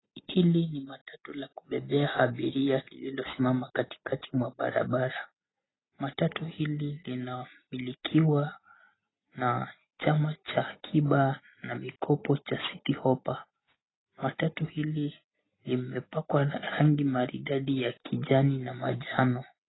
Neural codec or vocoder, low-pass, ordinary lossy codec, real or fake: none; 7.2 kHz; AAC, 16 kbps; real